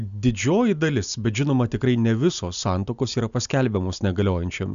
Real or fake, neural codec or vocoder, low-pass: real; none; 7.2 kHz